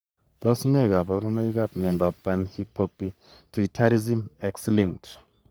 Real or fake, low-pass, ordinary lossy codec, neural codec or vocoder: fake; none; none; codec, 44.1 kHz, 3.4 kbps, Pupu-Codec